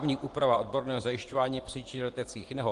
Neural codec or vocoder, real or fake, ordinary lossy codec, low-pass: vocoder, 24 kHz, 100 mel bands, Vocos; fake; Opus, 24 kbps; 10.8 kHz